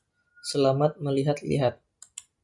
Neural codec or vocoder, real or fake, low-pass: none; real; 10.8 kHz